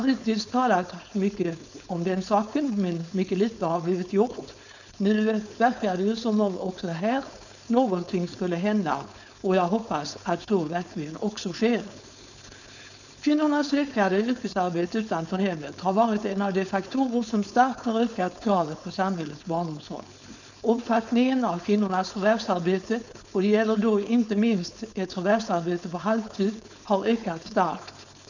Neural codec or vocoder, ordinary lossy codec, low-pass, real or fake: codec, 16 kHz, 4.8 kbps, FACodec; none; 7.2 kHz; fake